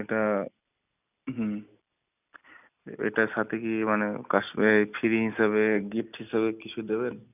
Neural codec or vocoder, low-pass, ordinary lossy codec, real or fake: none; 3.6 kHz; none; real